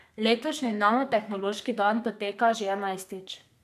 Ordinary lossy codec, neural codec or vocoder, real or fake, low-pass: none; codec, 32 kHz, 1.9 kbps, SNAC; fake; 14.4 kHz